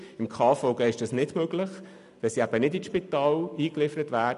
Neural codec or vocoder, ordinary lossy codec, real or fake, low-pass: none; none; real; 10.8 kHz